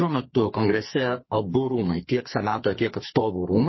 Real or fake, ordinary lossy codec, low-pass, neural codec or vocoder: fake; MP3, 24 kbps; 7.2 kHz; codec, 44.1 kHz, 2.6 kbps, SNAC